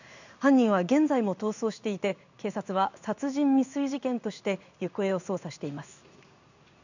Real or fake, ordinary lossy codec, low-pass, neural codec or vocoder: fake; none; 7.2 kHz; codec, 16 kHz in and 24 kHz out, 1 kbps, XY-Tokenizer